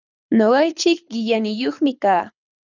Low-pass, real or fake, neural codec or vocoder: 7.2 kHz; fake; codec, 24 kHz, 6 kbps, HILCodec